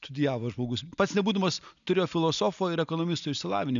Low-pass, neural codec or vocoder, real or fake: 7.2 kHz; none; real